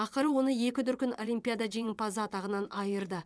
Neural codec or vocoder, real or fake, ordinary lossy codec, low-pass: vocoder, 22.05 kHz, 80 mel bands, WaveNeXt; fake; none; none